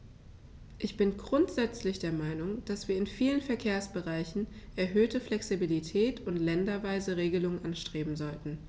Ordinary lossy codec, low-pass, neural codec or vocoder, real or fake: none; none; none; real